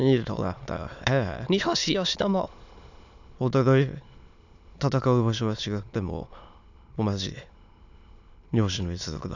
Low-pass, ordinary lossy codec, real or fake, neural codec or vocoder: 7.2 kHz; none; fake; autoencoder, 22.05 kHz, a latent of 192 numbers a frame, VITS, trained on many speakers